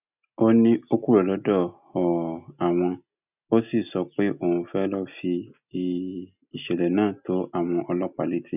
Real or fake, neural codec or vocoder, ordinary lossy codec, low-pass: real; none; none; 3.6 kHz